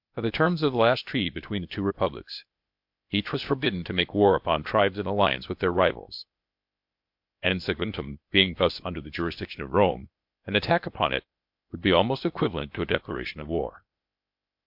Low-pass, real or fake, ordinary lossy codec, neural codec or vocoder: 5.4 kHz; fake; MP3, 48 kbps; codec, 16 kHz, 0.8 kbps, ZipCodec